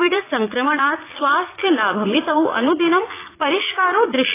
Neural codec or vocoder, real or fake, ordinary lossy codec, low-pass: vocoder, 44.1 kHz, 80 mel bands, Vocos; fake; AAC, 16 kbps; 3.6 kHz